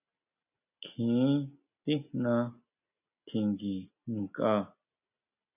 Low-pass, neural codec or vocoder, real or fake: 3.6 kHz; none; real